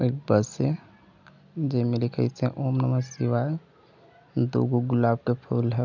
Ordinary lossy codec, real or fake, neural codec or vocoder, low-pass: none; real; none; 7.2 kHz